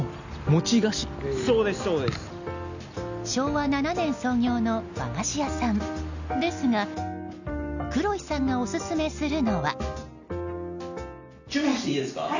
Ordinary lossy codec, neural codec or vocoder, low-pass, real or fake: none; none; 7.2 kHz; real